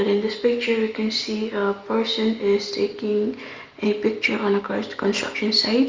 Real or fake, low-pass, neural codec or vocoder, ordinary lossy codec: real; 7.2 kHz; none; Opus, 32 kbps